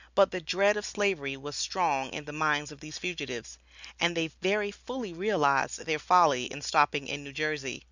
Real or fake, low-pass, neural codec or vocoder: real; 7.2 kHz; none